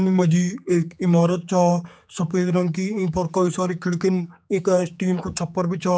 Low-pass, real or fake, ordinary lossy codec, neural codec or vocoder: none; fake; none; codec, 16 kHz, 4 kbps, X-Codec, HuBERT features, trained on general audio